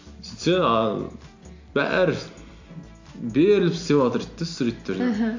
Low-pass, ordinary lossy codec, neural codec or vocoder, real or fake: 7.2 kHz; AAC, 48 kbps; none; real